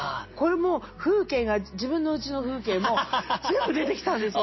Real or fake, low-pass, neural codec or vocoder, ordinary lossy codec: real; 7.2 kHz; none; MP3, 24 kbps